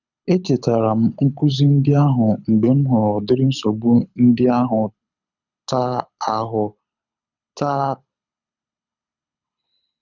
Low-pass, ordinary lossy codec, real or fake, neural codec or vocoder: 7.2 kHz; none; fake; codec, 24 kHz, 6 kbps, HILCodec